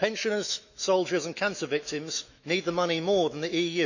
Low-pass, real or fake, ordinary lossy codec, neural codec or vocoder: 7.2 kHz; fake; none; autoencoder, 48 kHz, 128 numbers a frame, DAC-VAE, trained on Japanese speech